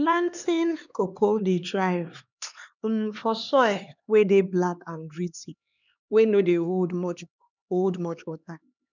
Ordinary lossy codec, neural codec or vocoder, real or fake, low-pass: none; codec, 16 kHz, 2 kbps, X-Codec, HuBERT features, trained on LibriSpeech; fake; 7.2 kHz